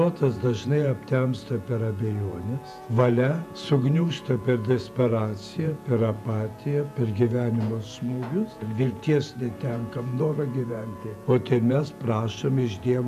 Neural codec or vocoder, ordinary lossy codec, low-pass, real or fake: vocoder, 48 kHz, 128 mel bands, Vocos; MP3, 96 kbps; 14.4 kHz; fake